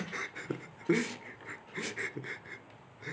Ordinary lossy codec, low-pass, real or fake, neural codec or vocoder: none; none; real; none